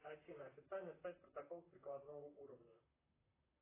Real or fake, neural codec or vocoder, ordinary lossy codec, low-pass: fake; vocoder, 44.1 kHz, 128 mel bands, Pupu-Vocoder; AAC, 16 kbps; 3.6 kHz